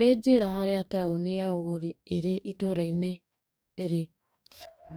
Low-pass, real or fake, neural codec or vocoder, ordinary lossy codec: none; fake; codec, 44.1 kHz, 2.6 kbps, DAC; none